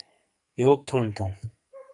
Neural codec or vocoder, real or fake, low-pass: codec, 44.1 kHz, 2.6 kbps, SNAC; fake; 10.8 kHz